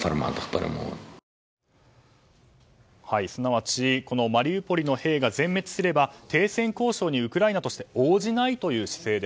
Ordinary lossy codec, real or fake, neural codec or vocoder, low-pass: none; real; none; none